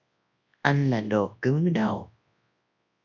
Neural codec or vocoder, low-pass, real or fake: codec, 24 kHz, 0.9 kbps, WavTokenizer, large speech release; 7.2 kHz; fake